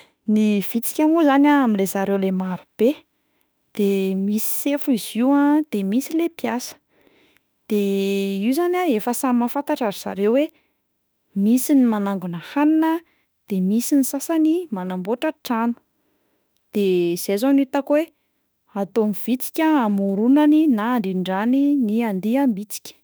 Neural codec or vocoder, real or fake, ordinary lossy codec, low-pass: autoencoder, 48 kHz, 32 numbers a frame, DAC-VAE, trained on Japanese speech; fake; none; none